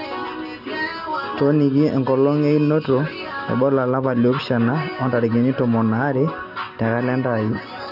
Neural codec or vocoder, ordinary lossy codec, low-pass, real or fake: none; none; 5.4 kHz; real